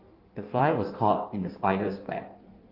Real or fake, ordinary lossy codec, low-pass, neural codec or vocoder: fake; Opus, 32 kbps; 5.4 kHz; codec, 16 kHz in and 24 kHz out, 1.1 kbps, FireRedTTS-2 codec